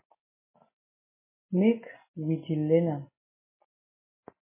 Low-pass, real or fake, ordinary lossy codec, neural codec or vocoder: 3.6 kHz; real; MP3, 16 kbps; none